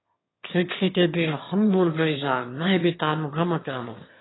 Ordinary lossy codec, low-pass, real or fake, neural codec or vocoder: AAC, 16 kbps; 7.2 kHz; fake; autoencoder, 22.05 kHz, a latent of 192 numbers a frame, VITS, trained on one speaker